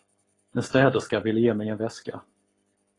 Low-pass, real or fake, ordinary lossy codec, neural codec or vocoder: 10.8 kHz; fake; AAC, 32 kbps; codec, 44.1 kHz, 7.8 kbps, Pupu-Codec